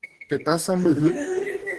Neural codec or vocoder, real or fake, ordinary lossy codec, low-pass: codec, 44.1 kHz, 2.6 kbps, DAC; fake; Opus, 16 kbps; 10.8 kHz